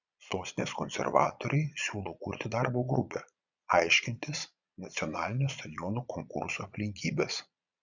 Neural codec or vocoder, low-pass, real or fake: vocoder, 22.05 kHz, 80 mel bands, Vocos; 7.2 kHz; fake